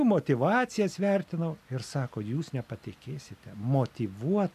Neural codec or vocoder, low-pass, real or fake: none; 14.4 kHz; real